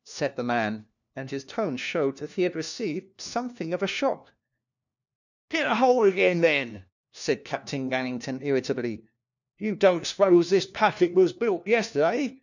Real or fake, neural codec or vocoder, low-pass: fake; codec, 16 kHz, 1 kbps, FunCodec, trained on LibriTTS, 50 frames a second; 7.2 kHz